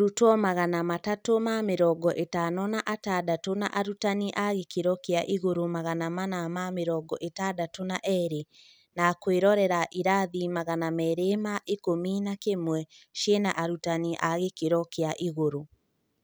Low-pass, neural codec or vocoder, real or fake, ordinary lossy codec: none; none; real; none